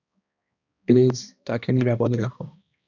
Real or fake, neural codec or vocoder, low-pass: fake; codec, 16 kHz, 1 kbps, X-Codec, HuBERT features, trained on balanced general audio; 7.2 kHz